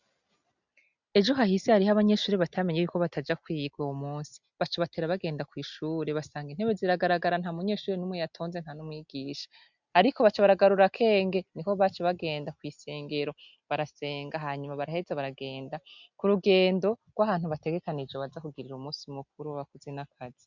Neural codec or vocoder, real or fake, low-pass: none; real; 7.2 kHz